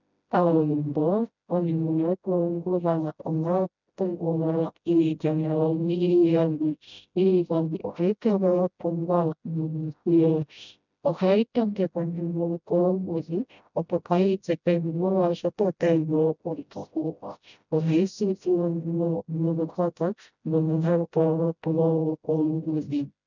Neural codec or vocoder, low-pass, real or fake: codec, 16 kHz, 0.5 kbps, FreqCodec, smaller model; 7.2 kHz; fake